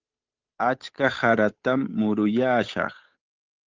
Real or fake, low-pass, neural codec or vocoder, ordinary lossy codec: fake; 7.2 kHz; codec, 16 kHz, 8 kbps, FunCodec, trained on Chinese and English, 25 frames a second; Opus, 32 kbps